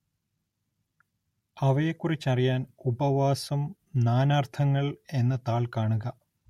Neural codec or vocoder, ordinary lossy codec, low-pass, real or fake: none; MP3, 64 kbps; 19.8 kHz; real